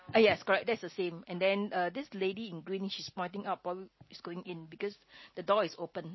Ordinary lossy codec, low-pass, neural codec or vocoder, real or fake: MP3, 24 kbps; 7.2 kHz; none; real